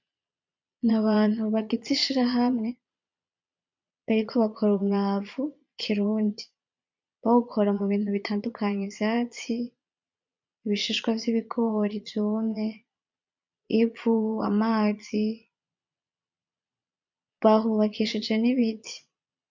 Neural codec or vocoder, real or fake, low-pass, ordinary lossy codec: vocoder, 22.05 kHz, 80 mel bands, Vocos; fake; 7.2 kHz; MP3, 64 kbps